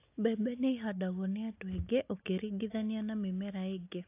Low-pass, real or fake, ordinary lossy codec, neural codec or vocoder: 3.6 kHz; real; AAC, 24 kbps; none